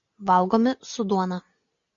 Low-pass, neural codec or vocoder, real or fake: 7.2 kHz; none; real